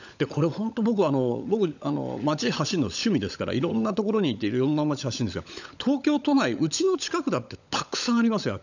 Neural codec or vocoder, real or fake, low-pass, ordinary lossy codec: codec, 16 kHz, 16 kbps, FunCodec, trained on LibriTTS, 50 frames a second; fake; 7.2 kHz; none